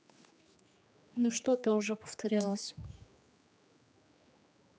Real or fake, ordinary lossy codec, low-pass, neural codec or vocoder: fake; none; none; codec, 16 kHz, 2 kbps, X-Codec, HuBERT features, trained on general audio